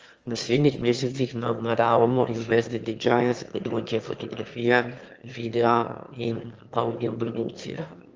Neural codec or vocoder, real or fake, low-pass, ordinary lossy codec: autoencoder, 22.05 kHz, a latent of 192 numbers a frame, VITS, trained on one speaker; fake; 7.2 kHz; Opus, 24 kbps